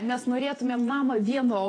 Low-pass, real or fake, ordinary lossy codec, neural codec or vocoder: 9.9 kHz; fake; AAC, 32 kbps; vocoder, 48 kHz, 128 mel bands, Vocos